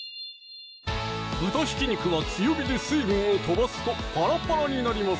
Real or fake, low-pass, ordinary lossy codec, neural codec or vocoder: real; none; none; none